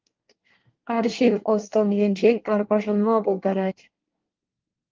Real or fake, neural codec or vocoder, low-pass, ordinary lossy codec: fake; codec, 24 kHz, 1 kbps, SNAC; 7.2 kHz; Opus, 32 kbps